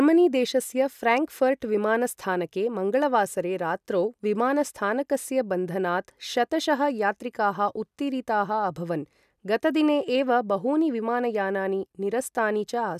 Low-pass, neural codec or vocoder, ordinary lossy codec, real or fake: 14.4 kHz; none; none; real